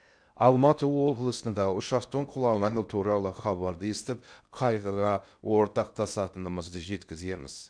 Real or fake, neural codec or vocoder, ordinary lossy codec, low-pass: fake; codec, 16 kHz in and 24 kHz out, 0.6 kbps, FocalCodec, streaming, 2048 codes; none; 9.9 kHz